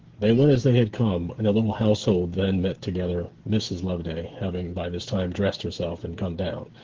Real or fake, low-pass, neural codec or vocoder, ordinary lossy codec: fake; 7.2 kHz; codec, 16 kHz, 8 kbps, FreqCodec, smaller model; Opus, 16 kbps